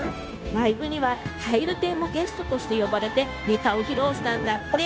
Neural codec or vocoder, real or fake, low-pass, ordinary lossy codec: codec, 16 kHz, 0.9 kbps, LongCat-Audio-Codec; fake; none; none